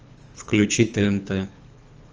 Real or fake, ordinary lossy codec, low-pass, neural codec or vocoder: fake; Opus, 24 kbps; 7.2 kHz; codec, 24 kHz, 3 kbps, HILCodec